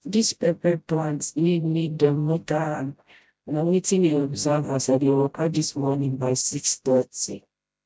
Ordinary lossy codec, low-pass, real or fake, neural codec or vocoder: none; none; fake; codec, 16 kHz, 0.5 kbps, FreqCodec, smaller model